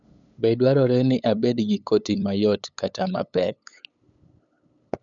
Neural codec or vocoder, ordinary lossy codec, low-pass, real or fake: codec, 16 kHz, 8 kbps, FunCodec, trained on LibriTTS, 25 frames a second; none; 7.2 kHz; fake